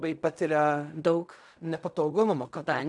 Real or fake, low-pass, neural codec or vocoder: fake; 10.8 kHz; codec, 16 kHz in and 24 kHz out, 0.4 kbps, LongCat-Audio-Codec, fine tuned four codebook decoder